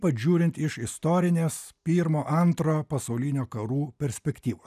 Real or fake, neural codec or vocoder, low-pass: real; none; 14.4 kHz